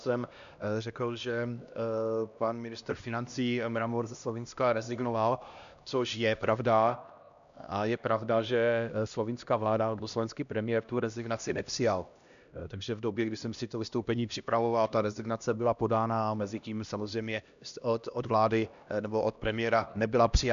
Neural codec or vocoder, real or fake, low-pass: codec, 16 kHz, 1 kbps, X-Codec, HuBERT features, trained on LibriSpeech; fake; 7.2 kHz